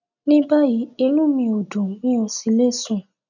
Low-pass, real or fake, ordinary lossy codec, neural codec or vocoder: 7.2 kHz; real; none; none